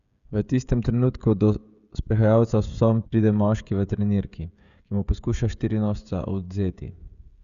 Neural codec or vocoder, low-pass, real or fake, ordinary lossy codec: codec, 16 kHz, 16 kbps, FreqCodec, smaller model; 7.2 kHz; fake; none